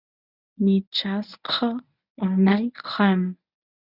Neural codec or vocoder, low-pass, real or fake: codec, 24 kHz, 0.9 kbps, WavTokenizer, medium speech release version 1; 5.4 kHz; fake